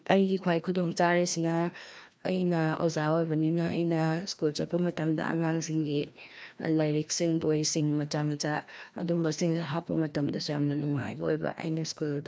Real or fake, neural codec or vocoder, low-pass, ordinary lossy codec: fake; codec, 16 kHz, 1 kbps, FreqCodec, larger model; none; none